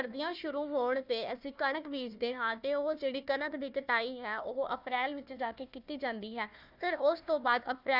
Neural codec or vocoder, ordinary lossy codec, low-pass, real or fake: codec, 16 kHz, 1 kbps, FunCodec, trained on Chinese and English, 50 frames a second; none; 5.4 kHz; fake